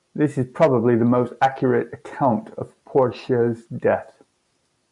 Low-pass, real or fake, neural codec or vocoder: 10.8 kHz; real; none